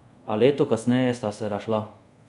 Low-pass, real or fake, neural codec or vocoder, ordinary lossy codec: 10.8 kHz; fake; codec, 24 kHz, 0.5 kbps, DualCodec; none